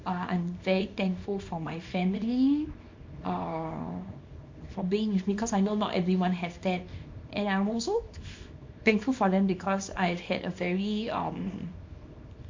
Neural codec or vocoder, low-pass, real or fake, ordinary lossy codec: codec, 24 kHz, 0.9 kbps, WavTokenizer, small release; 7.2 kHz; fake; MP3, 48 kbps